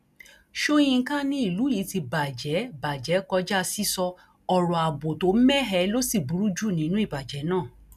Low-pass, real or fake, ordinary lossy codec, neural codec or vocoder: 14.4 kHz; real; none; none